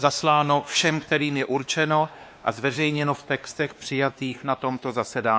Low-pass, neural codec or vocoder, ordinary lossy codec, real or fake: none; codec, 16 kHz, 2 kbps, X-Codec, WavLM features, trained on Multilingual LibriSpeech; none; fake